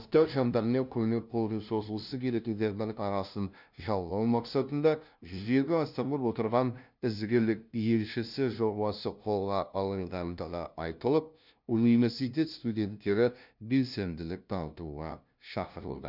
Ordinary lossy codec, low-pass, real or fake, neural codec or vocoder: none; 5.4 kHz; fake; codec, 16 kHz, 0.5 kbps, FunCodec, trained on LibriTTS, 25 frames a second